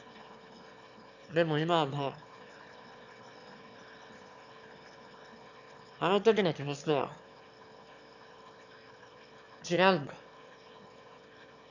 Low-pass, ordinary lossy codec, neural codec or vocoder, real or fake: 7.2 kHz; none; autoencoder, 22.05 kHz, a latent of 192 numbers a frame, VITS, trained on one speaker; fake